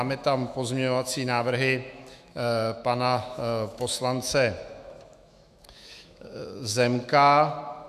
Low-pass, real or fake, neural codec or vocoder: 14.4 kHz; fake; autoencoder, 48 kHz, 128 numbers a frame, DAC-VAE, trained on Japanese speech